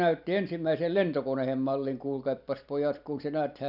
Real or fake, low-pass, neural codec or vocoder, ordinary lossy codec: fake; 5.4 kHz; vocoder, 44.1 kHz, 128 mel bands every 256 samples, BigVGAN v2; none